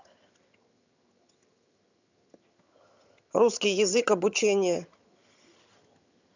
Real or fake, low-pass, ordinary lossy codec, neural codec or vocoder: fake; 7.2 kHz; none; vocoder, 22.05 kHz, 80 mel bands, HiFi-GAN